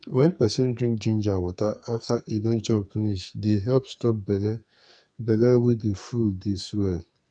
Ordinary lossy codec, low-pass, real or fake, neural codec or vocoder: none; 9.9 kHz; fake; codec, 44.1 kHz, 2.6 kbps, SNAC